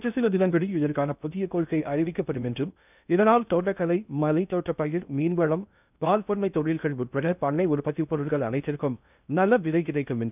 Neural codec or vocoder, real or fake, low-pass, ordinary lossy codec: codec, 16 kHz in and 24 kHz out, 0.6 kbps, FocalCodec, streaming, 2048 codes; fake; 3.6 kHz; none